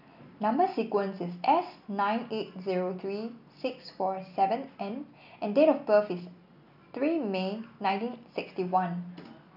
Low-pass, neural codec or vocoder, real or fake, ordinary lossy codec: 5.4 kHz; none; real; none